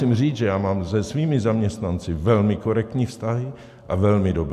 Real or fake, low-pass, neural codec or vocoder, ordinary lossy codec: real; 14.4 kHz; none; AAC, 96 kbps